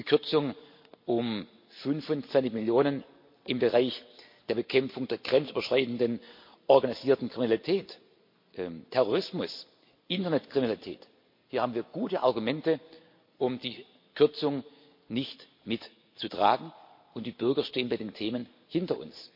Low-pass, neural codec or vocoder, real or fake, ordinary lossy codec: 5.4 kHz; none; real; MP3, 32 kbps